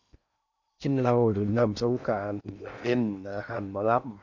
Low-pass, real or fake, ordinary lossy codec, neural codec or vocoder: 7.2 kHz; fake; none; codec, 16 kHz in and 24 kHz out, 0.8 kbps, FocalCodec, streaming, 65536 codes